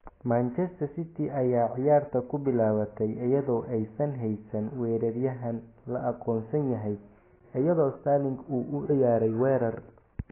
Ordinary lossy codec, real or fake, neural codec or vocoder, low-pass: AAC, 16 kbps; real; none; 3.6 kHz